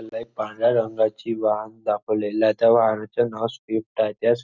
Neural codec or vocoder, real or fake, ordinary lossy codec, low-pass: none; real; Opus, 64 kbps; 7.2 kHz